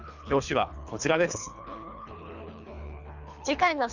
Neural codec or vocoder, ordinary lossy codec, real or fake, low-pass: codec, 24 kHz, 3 kbps, HILCodec; none; fake; 7.2 kHz